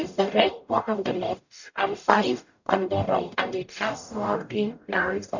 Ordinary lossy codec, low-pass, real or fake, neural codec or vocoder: none; 7.2 kHz; fake; codec, 44.1 kHz, 0.9 kbps, DAC